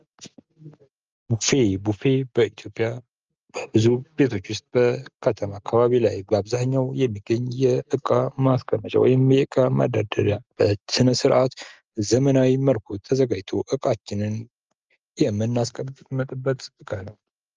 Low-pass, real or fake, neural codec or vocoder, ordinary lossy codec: 7.2 kHz; real; none; Opus, 24 kbps